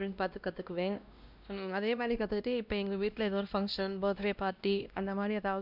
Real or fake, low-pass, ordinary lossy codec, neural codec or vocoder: fake; 5.4 kHz; none; codec, 16 kHz, 1 kbps, X-Codec, WavLM features, trained on Multilingual LibriSpeech